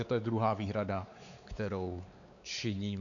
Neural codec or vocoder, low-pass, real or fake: codec, 16 kHz, 4 kbps, X-Codec, WavLM features, trained on Multilingual LibriSpeech; 7.2 kHz; fake